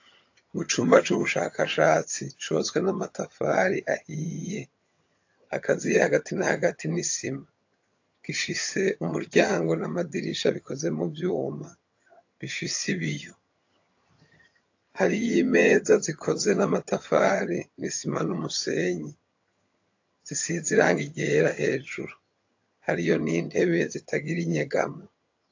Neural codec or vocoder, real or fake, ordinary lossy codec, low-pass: vocoder, 22.05 kHz, 80 mel bands, HiFi-GAN; fake; AAC, 48 kbps; 7.2 kHz